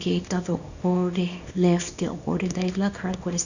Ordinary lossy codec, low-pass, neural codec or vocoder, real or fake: none; 7.2 kHz; codec, 24 kHz, 0.9 kbps, WavTokenizer, small release; fake